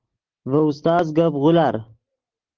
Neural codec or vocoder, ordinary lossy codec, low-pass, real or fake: none; Opus, 16 kbps; 7.2 kHz; real